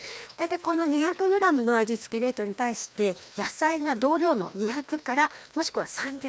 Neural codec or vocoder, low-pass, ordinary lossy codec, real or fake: codec, 16 kHz, 1 kbps, FreqCodec, larger model; none; none; fake